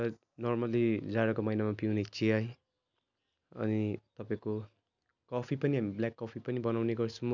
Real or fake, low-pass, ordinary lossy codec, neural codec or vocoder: real; 7.2 kHz; none; none